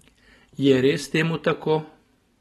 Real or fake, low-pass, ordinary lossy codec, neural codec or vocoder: real; 14.4 kHz; AAC, 32 kbps; none